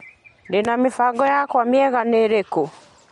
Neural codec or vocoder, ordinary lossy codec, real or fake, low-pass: none; MP3, 48 kbps; real; 19.8 kHz